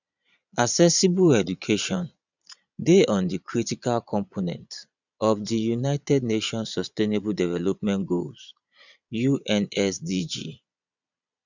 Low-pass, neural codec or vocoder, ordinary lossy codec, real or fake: 7.2 kHz; vocoder, 22.05 kHz, 80 mel bands, Vocos; none; fake